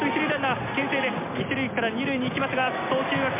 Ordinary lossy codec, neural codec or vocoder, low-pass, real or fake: MP3, 32 kbps; none; 3.6 kHz; real